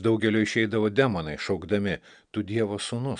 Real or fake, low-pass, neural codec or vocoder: real; 9.9 kHz; none